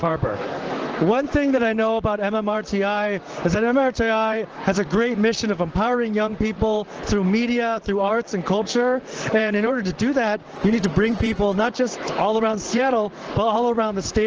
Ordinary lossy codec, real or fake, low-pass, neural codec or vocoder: Opus, 16 kbps; fake; 7.2 kHz; vocoder, 44.1 kHz, 128 mel bands, Pupu-Vocoder